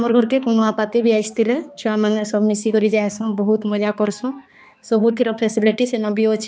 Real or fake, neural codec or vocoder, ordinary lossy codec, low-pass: fake; codec, 16 kHz, 2 kbps, X-Codec, HuBERT features, trained on balanced general audio; none; none